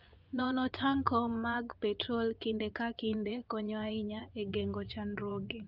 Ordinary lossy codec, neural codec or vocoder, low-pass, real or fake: Opus, 24 kbps; vocoder, 24 kHz, 100 mel bands, Vocos; 5.4 kHz; fake